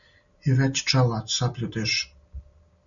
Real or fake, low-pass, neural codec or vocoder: real; 7.2 kHz; none